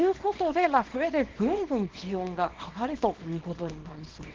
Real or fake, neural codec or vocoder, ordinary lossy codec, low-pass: fake; codec, 24 kHz, 0.9 kbps, WavTokenizer, small release; Opus, 16 kbps; 7.2 kHz